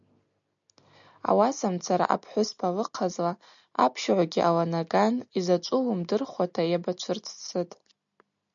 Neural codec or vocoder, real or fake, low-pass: none; real; 7.2 kHz